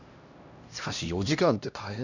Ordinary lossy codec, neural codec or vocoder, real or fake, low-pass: none; codec, 16 kHz, 1 kbps, X-Codec, HuBERT features, trained on LibriSpeech; fake; 7.2 kHz